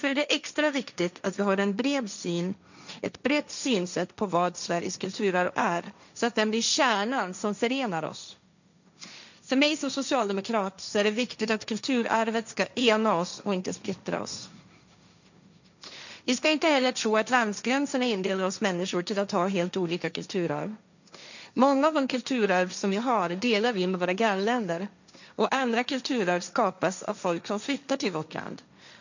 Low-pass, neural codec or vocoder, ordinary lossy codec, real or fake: 7.2 kHz; codec, 16 kHz, 1.1 kbps, Voila-Tokenizer; none; fake